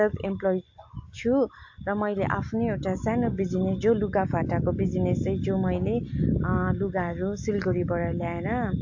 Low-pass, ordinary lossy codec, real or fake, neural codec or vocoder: 7.2 kHz; AAC, 48 kbps; real; none